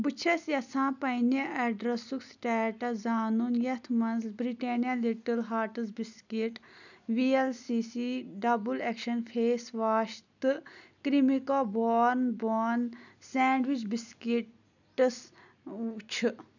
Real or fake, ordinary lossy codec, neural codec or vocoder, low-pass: real; none; none; 7.2 kHz